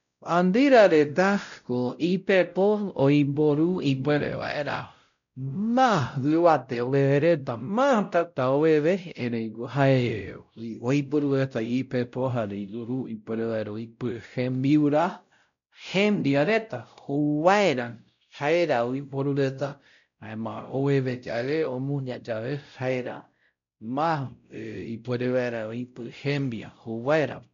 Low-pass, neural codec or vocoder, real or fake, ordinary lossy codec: 7.2 kHz; codec, 16 kHz, 0.5 kbps, X-Codec, WavLM features, trained on Multilingual LibriSpeech; fake; none